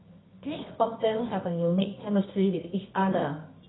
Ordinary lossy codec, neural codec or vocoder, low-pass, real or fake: AAC, 16 kbps; codec, 24 kHz, 0.9 kbps, WavTokenizer, medium music audio release; 7.2 kHz; fake